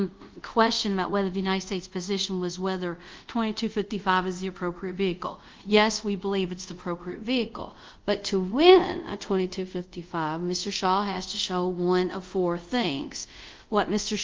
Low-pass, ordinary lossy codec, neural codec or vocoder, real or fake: 7.2 kHz; Opus, 32 kbps; codec, 24 kHz, 0.5 kbps, DualCodec; fake